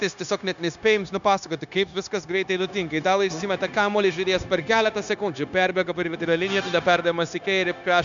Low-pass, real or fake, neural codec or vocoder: 7.2 kHz; fake; codec, 16 kHz, 0.9 kbps, LongCat-Audio-Codec